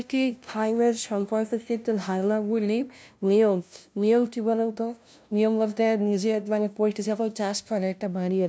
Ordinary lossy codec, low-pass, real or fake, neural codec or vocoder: none; none; fake; codec, 16 kHz, 0.5 kbps, FunCodec, trained on LibriTTS, 25 frames a second